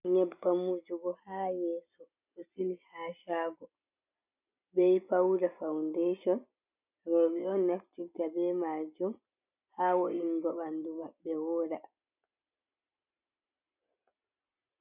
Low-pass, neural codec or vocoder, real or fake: 3.6 kHz; none; real